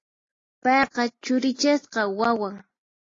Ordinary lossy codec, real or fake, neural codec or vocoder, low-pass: AAC, 32 kbps; real; none; 7.2 kHz